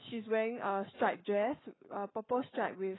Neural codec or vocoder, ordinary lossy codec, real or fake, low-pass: codec, 44.1 kHz, 7.8 kbps, Pupu-Codec; AAC, 16 kbps; fake; 7.2 kHz